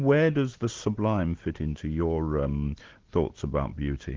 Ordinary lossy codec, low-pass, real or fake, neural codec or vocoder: Opus, 16 kbps; 7.2 kHz; real; none